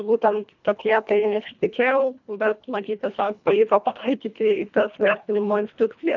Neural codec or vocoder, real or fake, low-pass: codec, 24 kHz, 1.5 kbps, HILCodec; fake; 7.2 kHz